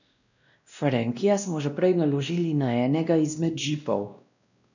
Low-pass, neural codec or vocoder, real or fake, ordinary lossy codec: 7.2 kHz; codec, 16 kHz, 1 kbps, X-Codec, WavLM features, trained on Multilingual LibriSpeech; fake; none